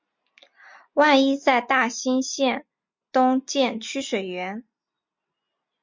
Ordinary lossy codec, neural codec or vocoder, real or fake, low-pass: MP3, 48 kbps; none; real; 7.2 kHz